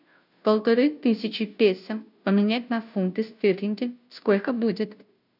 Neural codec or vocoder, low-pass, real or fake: codec, 16 kHz, 0.5 kbps, FunCodec, trained on Chinese and English, 25 frames a second; 5.4 kHz; fake